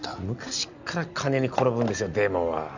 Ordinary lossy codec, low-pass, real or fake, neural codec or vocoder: Opus, 64 kbps; 7.2 kHz; real; none